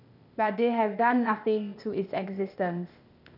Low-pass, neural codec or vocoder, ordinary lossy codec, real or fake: 5.4 kHz; codec, 16 kHz, 0.8 kbps, ZipCodec; none; fake